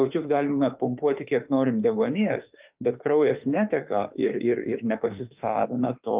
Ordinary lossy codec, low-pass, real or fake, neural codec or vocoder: Opus, 24 kbps; 3.6 kHz; fake; autoencoder, 48 kHz, 32 numbers a frame, DAC-VAE, trained on Japanese speech